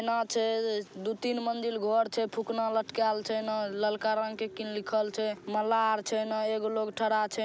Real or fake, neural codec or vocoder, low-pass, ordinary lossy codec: real; none; none; none